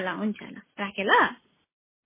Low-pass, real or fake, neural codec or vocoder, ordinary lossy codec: 3.6 kHz; real; none; MP3, 16 kbps